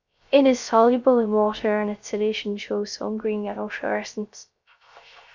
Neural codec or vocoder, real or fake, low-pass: codec, 16 kHz, 0.3 kbps, FocalCodec; fake; 7.2 kHz